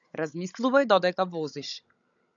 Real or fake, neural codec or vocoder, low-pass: fake; codec, 16 kHz, 16 kbps, FunCodec, trained on Chinese and English, 50 frames a second; 7.2 kHz